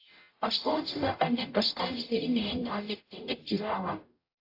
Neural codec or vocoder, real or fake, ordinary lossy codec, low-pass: codec, 44.1 kHz, 0.9 kbps, DAC; fake; AAC, 32 kbps; 5.4 kHz